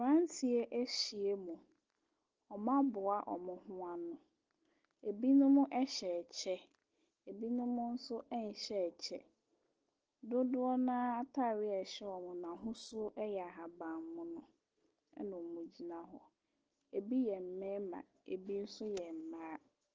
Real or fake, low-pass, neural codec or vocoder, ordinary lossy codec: real; 7.2 kHz; none; Opus, 16 kbps